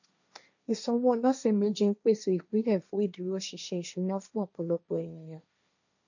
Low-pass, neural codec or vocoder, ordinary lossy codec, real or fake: 7.2 kHz; codec, 16 kHz, 1.1 kbps, Voila-Tokenizer; none; fake